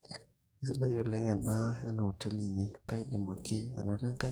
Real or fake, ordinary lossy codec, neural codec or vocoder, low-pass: fake; none; codec, 44.1 kHz, 2.6 kbps, DAC; none